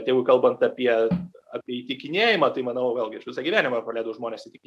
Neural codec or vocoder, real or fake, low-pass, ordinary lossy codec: none; real; 14.4 kHz; AAC, 96 kbps